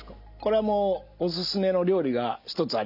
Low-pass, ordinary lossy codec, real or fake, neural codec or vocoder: 5.4 kHz; none; real; none